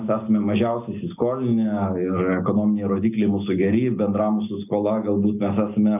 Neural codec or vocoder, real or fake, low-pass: none; real; 3.6 kHz